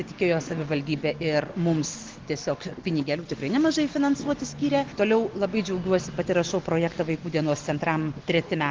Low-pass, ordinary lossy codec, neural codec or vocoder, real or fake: 7.2 kHz; Opus, 16 kbps; none; real